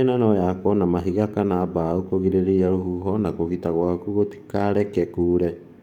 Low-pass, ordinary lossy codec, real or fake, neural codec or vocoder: 19.8 kHz; none; fake; codec, 44.1 kHz, 7.8 kbps, DAC